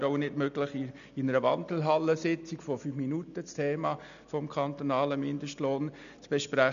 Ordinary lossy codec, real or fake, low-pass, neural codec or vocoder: none; real; 7.2 kHz; none